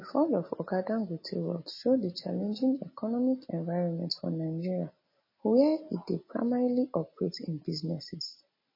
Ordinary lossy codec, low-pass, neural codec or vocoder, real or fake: MP3, 24 kbps; 5.4 kHz; none; real